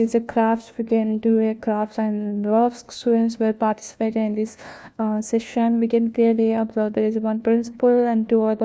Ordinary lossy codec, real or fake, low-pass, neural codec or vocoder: none; fake; none; codec, 16 kHz, 1 kbps, FunCodec, trained on LibriTTS, 50 frames a second